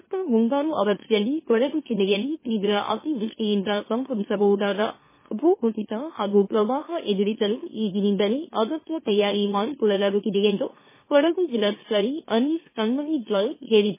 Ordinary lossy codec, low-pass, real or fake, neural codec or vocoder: MP3, 16 kbps; 3.6 kHz; fake; autoencoder, 44.1 kHz, a latent of 192 numbers a frame, MeloTTS